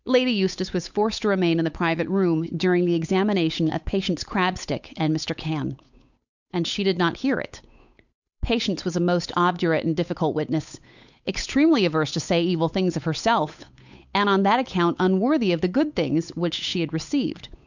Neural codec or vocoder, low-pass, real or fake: codec, 16 kHz, 8 kbps, FunCodec, trained on Chinese and English, 25 frames a second; 7.2 kHz; fake